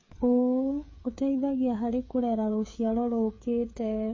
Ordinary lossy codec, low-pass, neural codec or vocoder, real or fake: MP3, 32 kbps; 7.2 kHz; codec, 16 kHz, 8 kbps, FreqCodec, smaller model; fake